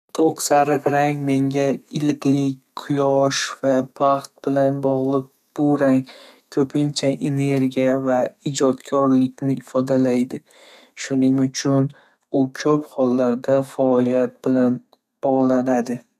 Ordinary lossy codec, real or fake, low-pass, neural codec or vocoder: none; fake; 14.4 kHz; codec, 32 kHz, 1.9 kbps, SNAC